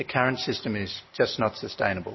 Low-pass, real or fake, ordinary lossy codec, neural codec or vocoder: 7.2 kHz; real; MP3, 24 kbps; none